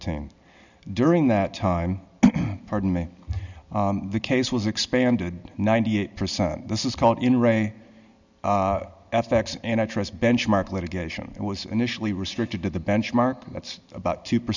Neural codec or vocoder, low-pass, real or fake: none; 7.2 kHz; real